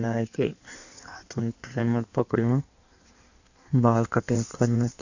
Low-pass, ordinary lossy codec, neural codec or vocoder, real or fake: 7.2 kHz; none; codec, 16 kHz in and 24 kHz out, 1.1 kbps, FireRedTTS-2 codec; fake